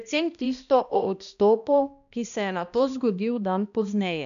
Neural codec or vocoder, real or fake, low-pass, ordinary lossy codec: codec, 16 kHz, 1 kbps, X-Codec, HuBERT features, trained on balanced general audio; fake; 7.2 kHz; MP3, 96 kbps